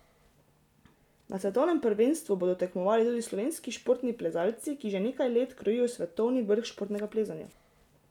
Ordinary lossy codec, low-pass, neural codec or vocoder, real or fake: none; 19.8 kHz; none; real